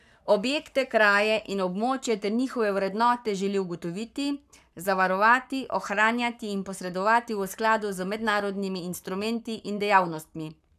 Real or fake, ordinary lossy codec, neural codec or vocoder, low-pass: fake; none; codec, 44.1 kHz, 7.8 kbps, Pupu-Codec; 14.4 kHz